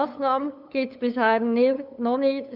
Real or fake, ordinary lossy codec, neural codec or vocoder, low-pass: fake; none; codec, 16 kHz, 8 kbps, FunCodec, trained on LibriTTS, 25 frames a second; 5.4 kHz